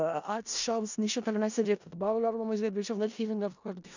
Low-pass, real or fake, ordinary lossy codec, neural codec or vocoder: 7.2 kHz; fake; none; codec, 16 kHz in and 24 kHz out, 0.4 kbps, LongCat-Audio-Codec, four codebook decoder